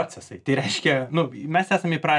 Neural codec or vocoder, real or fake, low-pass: none; real; 10.8 kHz